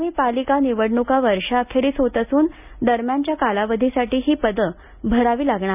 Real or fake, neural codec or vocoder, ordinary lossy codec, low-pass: real; none; none; 3.6 kHz